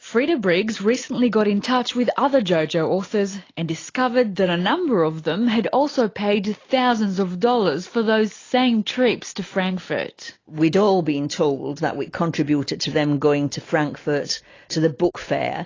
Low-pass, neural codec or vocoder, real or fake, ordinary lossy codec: 7.2 kHz; none; real; AAC, 32 kbps